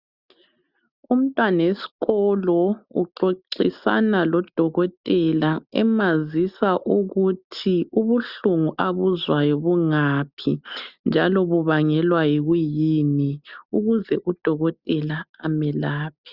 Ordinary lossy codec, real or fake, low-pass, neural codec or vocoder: Opus, 64 kbps; real; 5.4 kHz; none